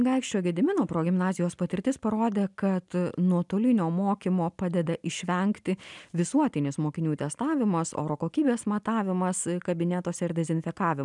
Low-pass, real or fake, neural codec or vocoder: 10.8 kHz; real; none